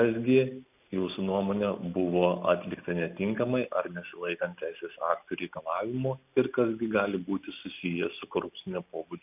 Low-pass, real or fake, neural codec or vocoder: 3.6 kHz; real; none